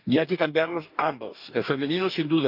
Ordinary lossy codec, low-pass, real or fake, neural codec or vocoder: none; 5.4 kHz; fake; codec, 44.1 kHz, 2.6 kbps, DAC